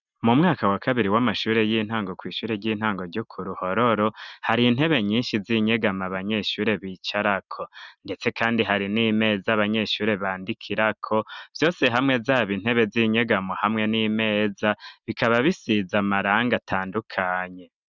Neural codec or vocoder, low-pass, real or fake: none; 7.2 kHz; real